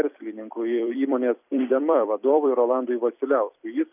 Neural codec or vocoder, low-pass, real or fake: vocoder, 44.1 kHz, 128 mel bands every 256 samples, BigVGAN v2; 3.6 kHz; fake